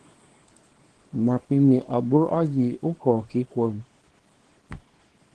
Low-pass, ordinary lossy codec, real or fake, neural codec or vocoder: 10.8 kHz; Opus, 16 kbps; fake; codec, 24 kHz, 0.9 kbps, WavTokenizer, small release